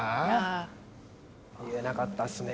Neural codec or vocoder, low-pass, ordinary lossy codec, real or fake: none; none; none; real